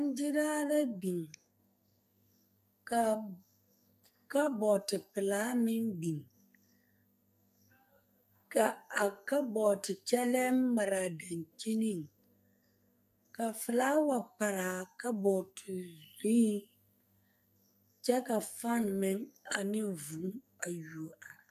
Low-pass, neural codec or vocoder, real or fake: 14.4 kHz; codec, 44.1 kHz, 2.6 kbps, SNAC; fake